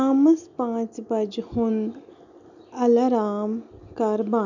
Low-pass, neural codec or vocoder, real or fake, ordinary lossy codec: 7.2 kHz; none; real; none